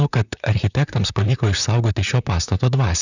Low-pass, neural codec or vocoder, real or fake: 7.2 kHz; vocoder, 44.1 kHz, 128 mel bands, Pupu-Vocoder; fake